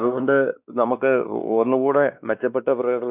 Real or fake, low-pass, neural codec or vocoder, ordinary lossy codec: fake; 3.6 kHz; codec, 16 kHz, 2 kbps, X-Codec, WavLM features, trained on Multilingual LibriSpeech; none